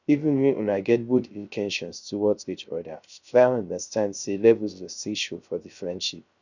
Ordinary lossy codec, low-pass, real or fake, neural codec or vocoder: none; 7.2 kHz; fake; codec, 16 kHz, 0.3 kbps, FocalCodec